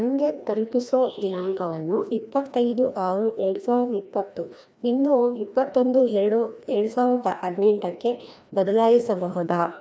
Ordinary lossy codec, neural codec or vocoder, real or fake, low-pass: none; codec, 16 kHz, 1 kbps, FreqCodec, larger model; fake; none